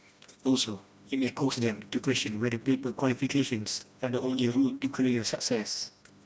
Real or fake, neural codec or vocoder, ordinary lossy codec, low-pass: fake; codec, 16 kHz, 1 kbps, FreqCodec, smaller model; none; none